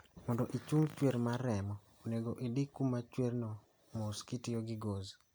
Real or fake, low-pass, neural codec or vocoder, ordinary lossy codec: real; none; none; none